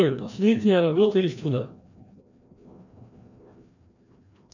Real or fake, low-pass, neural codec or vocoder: fake; 7.2 kHz; codec, 16 kHz, 1 kbps, FreqCodec, larger model